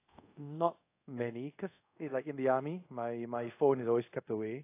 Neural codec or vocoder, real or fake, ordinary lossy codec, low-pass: codec, 24 kHz, 0.5 kbps, DualCodec; fake; AAC, 24 kbps; 3.6 kHz